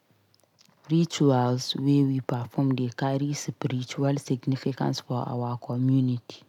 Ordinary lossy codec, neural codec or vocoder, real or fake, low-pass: none; none; real; 19.8 kHz